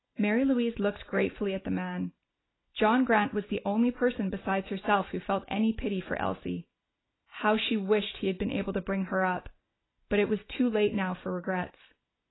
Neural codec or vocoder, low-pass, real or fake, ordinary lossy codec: none; 7.2 kHz; real; AAC, 16 kbps